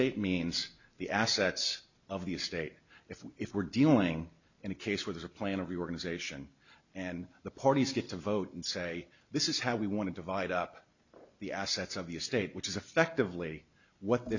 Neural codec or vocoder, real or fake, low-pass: none; real; 7.2 kHz